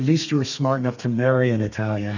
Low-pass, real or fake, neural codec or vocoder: 7.2 kHz; fake; codec, 32 kHz, 1.9 kbps, SNAC